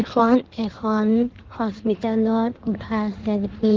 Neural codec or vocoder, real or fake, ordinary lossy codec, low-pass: codec, 16 kHz in and 24 kHz out, 1.1 kbps, FireRedTTS-2 codec; fake; Opus, 16 kbps; 7.2 kHz